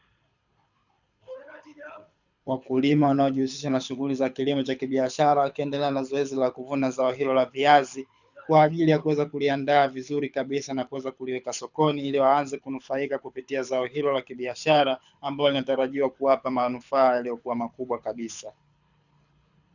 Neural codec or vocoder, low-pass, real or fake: codec, 24 kHz, 6 kbps, HILCodec; 7.2 kHz; fake